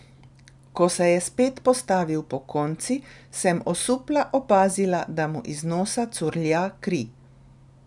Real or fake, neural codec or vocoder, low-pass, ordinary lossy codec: real; none; 10.8 kHz; none